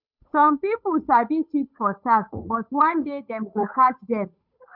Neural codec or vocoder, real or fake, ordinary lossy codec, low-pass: codec, 16 kHz, 8 kbps, FunCodec, trained on Chinese and English, 25 frames a second; fake; none; 5.4 kHz